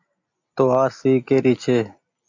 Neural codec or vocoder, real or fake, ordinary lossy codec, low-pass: none; real; AAC, 48 kbps; 7.2 kHz